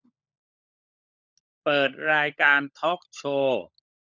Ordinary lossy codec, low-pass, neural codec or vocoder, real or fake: none; 7.2 kHz; codec, 16 kHz, 16 kbps, FunCodec, trained on LibriTTS, 50 frames a second; fake